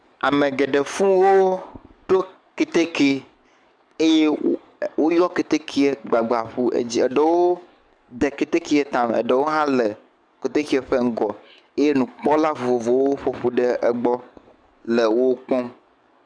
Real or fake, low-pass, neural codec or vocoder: fake; 9.9 kHz; codec, 44.1 kHz, 7.8 kbps, DAC